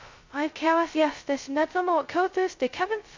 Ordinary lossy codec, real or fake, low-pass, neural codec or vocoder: MP3, 48 kbps; fake; 7.2 kHz; codec, 16 kHz, 0.2 kbps, FocalCodec